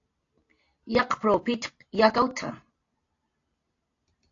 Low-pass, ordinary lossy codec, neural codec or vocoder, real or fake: 7.2 kHz; AAC, 48 kbps; none; real